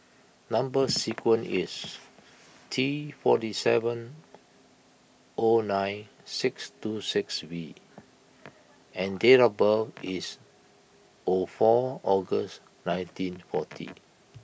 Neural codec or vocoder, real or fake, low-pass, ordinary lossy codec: none; real; none; none